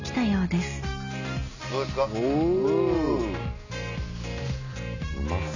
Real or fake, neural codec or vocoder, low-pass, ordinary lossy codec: real; none; 7.2 kHz; none